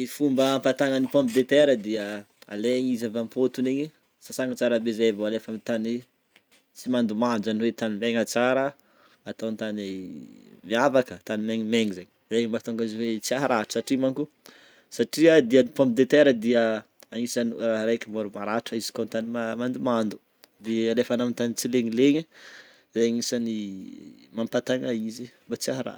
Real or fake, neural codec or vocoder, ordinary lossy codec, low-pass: real; none; none; none